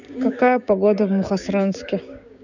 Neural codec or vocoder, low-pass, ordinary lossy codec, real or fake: codec, 16 kHz, 6 kbps, DAC; 7.2 kHz; none; fake